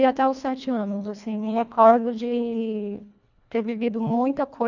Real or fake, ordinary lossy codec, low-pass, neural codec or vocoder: fake; none; 7.2 kHz; codec, 24 kHz, 1.5 kbps, HILCodec